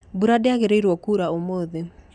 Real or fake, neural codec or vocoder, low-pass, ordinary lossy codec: real; none; 9.9 kHz; none